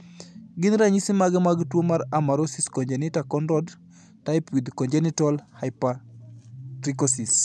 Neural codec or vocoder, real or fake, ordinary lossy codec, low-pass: none; real; none; none